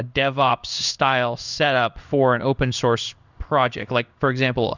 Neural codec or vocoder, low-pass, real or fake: codec, 16 kHz in and 24 kHz out, 1 kbps, XY-Tokenizer; 7.2 kHz; fake